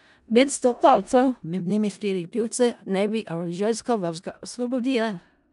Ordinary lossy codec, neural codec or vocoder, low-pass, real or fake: none; codec, 16 kHz in and 24 kHz out, 0.4 kbps, LongCat-Audio-Codec, four codebook decoder; 10.8 kHz; fake